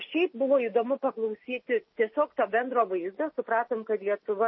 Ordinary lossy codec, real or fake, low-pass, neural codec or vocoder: MP3, 24 kbps; real; 7.2 kHz; none